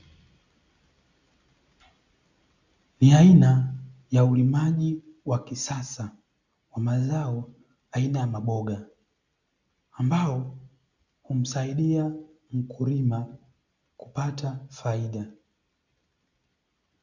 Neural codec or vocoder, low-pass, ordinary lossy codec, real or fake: none; 7.2 kHz; Opus, 64 kbps; real